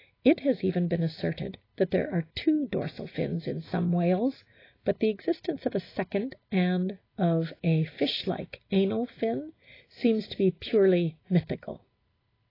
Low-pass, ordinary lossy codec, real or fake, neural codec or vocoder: 5.4 kHz; AAC, 24 kbps; real; none